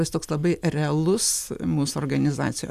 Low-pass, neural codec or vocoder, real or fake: 14.4 kHz; none; real